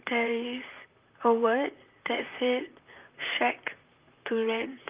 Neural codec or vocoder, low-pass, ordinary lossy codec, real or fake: vocoder, 44.1 kHz, 128 mel bands, Pupu-Vocoder; 3.6 kHz; Opus, 24 kbps; fake